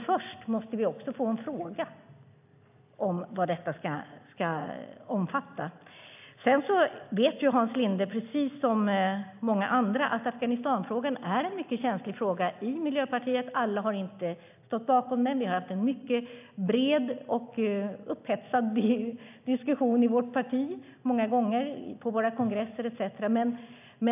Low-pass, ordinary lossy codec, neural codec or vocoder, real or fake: 3.6 kHz; AAC, 32 kbps; none; real